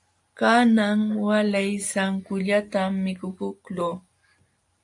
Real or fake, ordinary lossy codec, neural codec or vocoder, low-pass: real; AAC, 64 kbps; none; 10.8 kHz